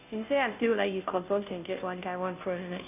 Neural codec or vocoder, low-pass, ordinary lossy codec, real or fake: codec, 16 kHz, 0.5 kbps, FunCodec, trained on Chinese and English, 25 frames a second; 3.6 kHz; none; fake